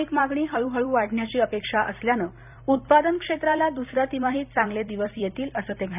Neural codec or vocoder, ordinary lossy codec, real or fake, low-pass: vocoder, 44.1 kHz, 128 mel bands every 256 samples, BigVGAN v2; none; fake; 3.6 kHz